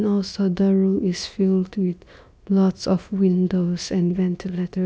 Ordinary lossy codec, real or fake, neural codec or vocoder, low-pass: none; fake; codec, 16 kHz, about 1 kbps, DyCAST, with the encoder's durations; none